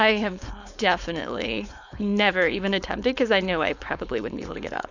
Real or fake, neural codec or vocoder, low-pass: fake; codec, 16 kHz, 4.8 kbps, FACodec; 7.2 kHz